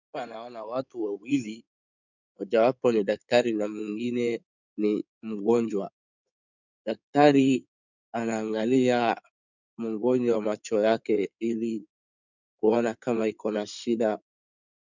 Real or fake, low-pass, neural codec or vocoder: fake; 7.2 kHz; codec, 16 kHz in and 24 kHz out, 2.2 kbps, FireRedTTS-2 codec